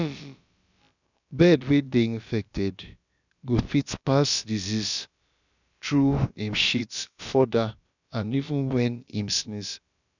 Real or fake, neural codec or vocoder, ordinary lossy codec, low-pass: fake; codec, 16 kHz, about 1 kbps, DyCAST, with the encoder's durations; none; 7.2 kHz